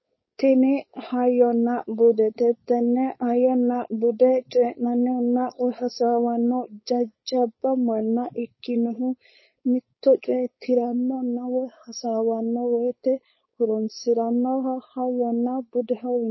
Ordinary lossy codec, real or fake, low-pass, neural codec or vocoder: MP3, 24 kbps; fake; 7.2 kHz; codec, 16 kHz, 4.8 kbps, FACodec